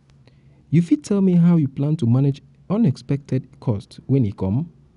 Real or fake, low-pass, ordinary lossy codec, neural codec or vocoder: real; 10.8 kHz; none; none